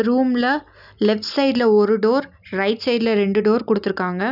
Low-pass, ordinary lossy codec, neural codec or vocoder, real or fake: 5.4 kHz; none; none; real